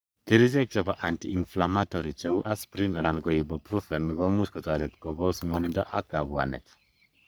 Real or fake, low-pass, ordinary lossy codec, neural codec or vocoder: fake; none; none; codec, 44.1 kHz, 3.4 kbps, Pupu-Codec